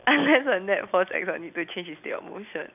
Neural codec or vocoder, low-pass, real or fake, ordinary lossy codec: none; 3.6 kHz; real; none